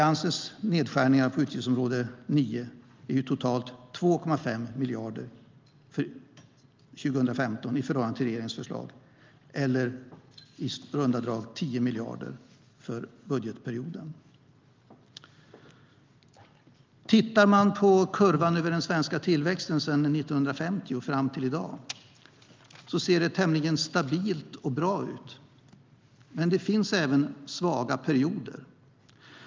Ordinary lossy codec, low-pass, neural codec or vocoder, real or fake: Opus, 24 kbps; 7.2 kHz; none; real